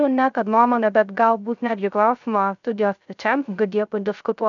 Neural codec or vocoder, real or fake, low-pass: codec, 16 kHz, 0.3 kbps, FocalCodec; fake; 7.2 kHz